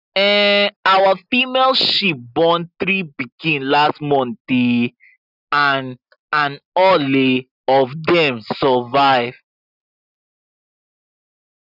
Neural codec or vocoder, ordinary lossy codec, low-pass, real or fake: none; none; 5.4 kHz; real